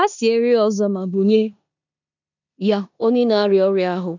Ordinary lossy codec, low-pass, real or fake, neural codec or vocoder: none; 7.2 kHz; fake; codec, 16 kHz in and 24 kHz out, 0.9 kbps, LongCat-Audio-Codec, four codebook decoder